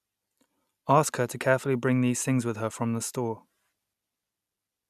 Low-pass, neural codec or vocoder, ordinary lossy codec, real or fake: 14.4 kHz; none; none; real